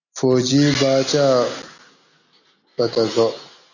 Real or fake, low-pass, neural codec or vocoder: real; 7.2 kHz; none